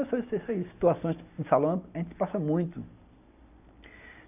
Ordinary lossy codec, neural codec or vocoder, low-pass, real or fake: none; none; 3.6 kHz; real